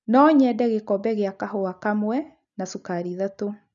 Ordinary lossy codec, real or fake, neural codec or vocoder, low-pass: none; real; none; 7.2 kHz